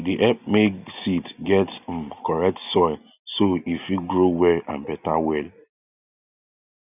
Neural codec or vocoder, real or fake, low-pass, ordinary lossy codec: none; real; 3.6 kHz; none